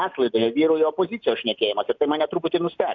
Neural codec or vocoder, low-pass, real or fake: none; 7.2 kHz; real